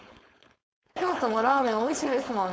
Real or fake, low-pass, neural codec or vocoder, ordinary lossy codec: fake; none; codec, 16 kHz, 4.8 kbps, FACodec; none